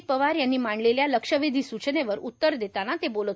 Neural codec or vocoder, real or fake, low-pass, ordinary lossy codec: none; real; none; none